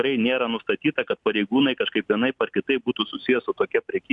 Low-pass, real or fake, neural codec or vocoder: 10.8 kHz; real; none